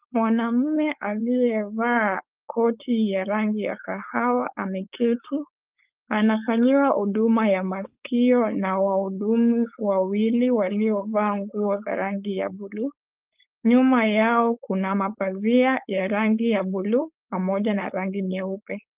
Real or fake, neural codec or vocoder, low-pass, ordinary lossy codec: fake; codec, 16 kHz, 4.8 kbps, FACodec; 3.6 kHz; Opus, 32 kbps